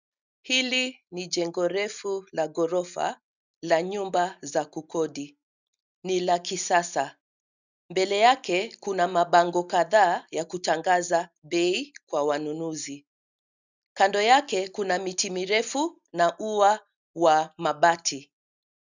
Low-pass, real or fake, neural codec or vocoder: 7.2 kHz; real; none